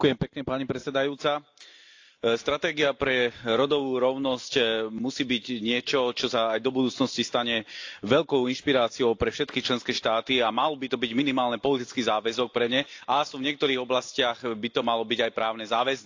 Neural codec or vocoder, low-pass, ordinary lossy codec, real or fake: none; 7.2 kHz; AAC, 48 kbps; real